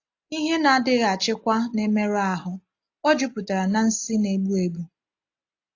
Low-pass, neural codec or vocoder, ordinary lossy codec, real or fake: 7.2 kHz; none; AAC, 48 kbps; real